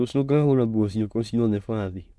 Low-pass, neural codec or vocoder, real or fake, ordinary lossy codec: none; autoencoder, 22.05 kHz, a latent of 192 numbers a frame, VITS, trained on many speakers; fake; none